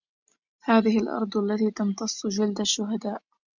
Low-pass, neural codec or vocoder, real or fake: 7.2 kHz; none; real